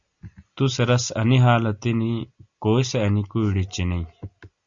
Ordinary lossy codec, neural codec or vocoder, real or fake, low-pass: Opus, 64 kbps; none; real; 7.2 kHz